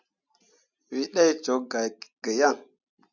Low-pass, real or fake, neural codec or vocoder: 7.2 kHz; real; none